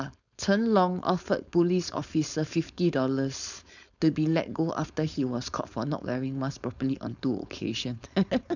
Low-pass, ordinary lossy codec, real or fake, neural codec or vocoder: 7.2 kHz; none; fake; codec, 16 kHz, 4.8 kbps, FACodec